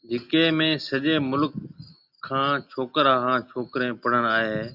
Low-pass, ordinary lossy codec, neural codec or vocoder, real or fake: 5.4 kHz; Opus, 64 kbps; none; real